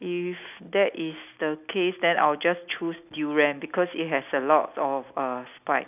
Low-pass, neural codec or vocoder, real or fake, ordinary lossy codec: 3.6 kHz; none; real; none